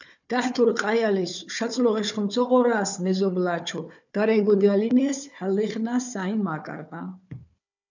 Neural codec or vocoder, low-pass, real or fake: codec, 16 kHz, 4 kbps, FunCodec, trained on Chinese and English, 50 frames a second; 7.2 kHz; fake